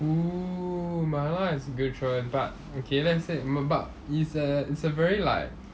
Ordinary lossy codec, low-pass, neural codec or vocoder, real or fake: none; none; none; real